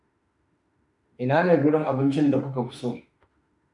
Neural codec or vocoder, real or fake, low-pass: autoencoder, 48 kHz, 32 numbers a frame, DAC-VAE, trained on Japanese speech; fake; 10.8 kHz